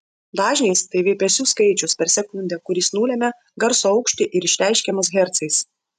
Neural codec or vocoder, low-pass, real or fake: none; 14.4 kHz; real